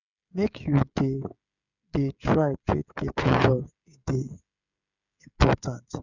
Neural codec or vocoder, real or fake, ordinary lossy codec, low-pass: codec, 16 kHz, 16 kbps, FreqCodec, smaller model; fake; none; 7.2 kHz